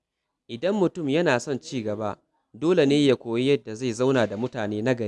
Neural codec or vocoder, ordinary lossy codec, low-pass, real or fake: none; none; none; real